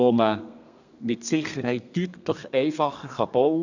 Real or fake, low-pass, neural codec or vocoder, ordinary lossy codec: fake; 7.2 kHz; codec, 32 kHz, 1.9 kbps, SNAC; none